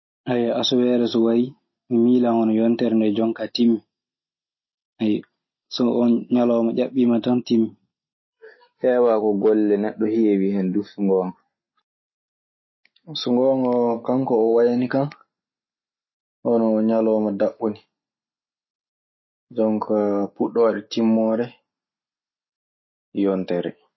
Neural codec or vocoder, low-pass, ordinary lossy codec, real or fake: none; 7.2 kHz; MP3, 24 kbps; real